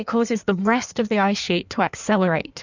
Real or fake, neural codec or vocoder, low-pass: fake; codec, 16 kHz in and 24 kHz out, 1.1 kbps, FireRedTTS-2 codec; 7.2 kHz